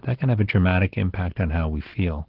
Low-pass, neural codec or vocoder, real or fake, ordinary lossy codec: 5.4 kHz; none; real; Opus, 16 kbps